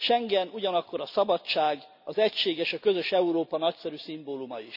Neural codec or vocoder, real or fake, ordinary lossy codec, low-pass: none; real; none; 5.4 kHz